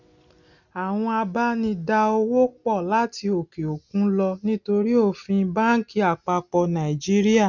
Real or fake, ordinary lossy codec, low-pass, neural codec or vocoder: real; none; 7.2 kHz; none